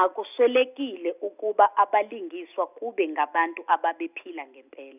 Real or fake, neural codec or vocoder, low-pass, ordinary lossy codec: real; none; 3.6 kHz; none